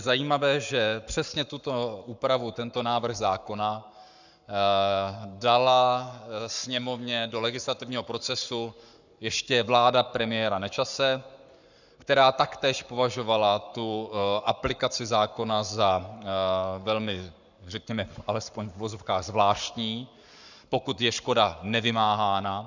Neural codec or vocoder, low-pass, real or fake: codec, 44.1 kHz, 7.8 kbps, Pupu-Codec; 7.2 kHz; fake